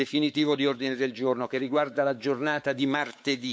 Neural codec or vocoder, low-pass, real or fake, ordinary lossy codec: codec, 16 kHz, 4 kbps, X-Codec, WavLM features, trained on Multilingual LibriSpeech; none; fake; none